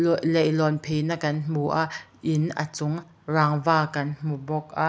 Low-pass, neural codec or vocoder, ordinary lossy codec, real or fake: none; none; none; real